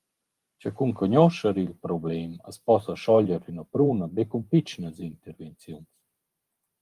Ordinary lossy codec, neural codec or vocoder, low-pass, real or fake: Opus, 32 kbps; vocoder, 44.1 kHz, 128 mel bands every 512 samples, BigVGAN v2; 14.4 kHz; fake